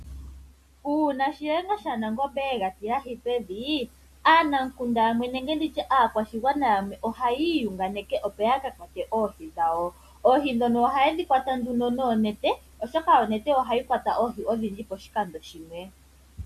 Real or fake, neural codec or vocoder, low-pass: real; none; 14.4 kHz